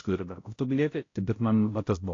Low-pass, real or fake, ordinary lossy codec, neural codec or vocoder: 7.2 kHz; fake; AAC, 32 kbps; codec, 16 kHz, 0.5 kbps, X-Codec, HuBERT features, trained on balanced general audio